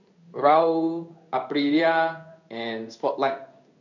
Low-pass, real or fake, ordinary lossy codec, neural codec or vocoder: 7.2 kHz; fake; none; codec, 16 kHz in and 24 kHz out, 1 kbps, XY-Tokenizer